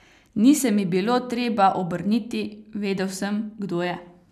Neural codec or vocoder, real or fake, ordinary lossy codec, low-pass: none; real; none; 14.4 kHz